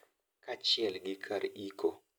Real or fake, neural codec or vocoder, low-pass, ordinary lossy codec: real; none; none; none